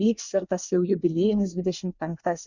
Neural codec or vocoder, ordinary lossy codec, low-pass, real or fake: codec, 24 kHz, 1 kbps, SNAC; Opus, 64 kbps; 7.2 kHz; fake